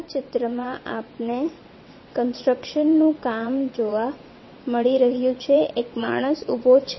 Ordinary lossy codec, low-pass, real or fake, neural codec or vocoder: MP3, 24 kbps; 7.2 kHz; fake; vocoder, 22.05 kHz, 80 mel bands, WaveNeXt